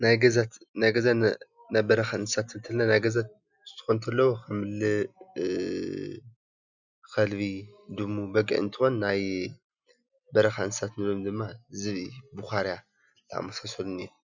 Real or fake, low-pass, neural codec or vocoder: real; 7.2 kHz; none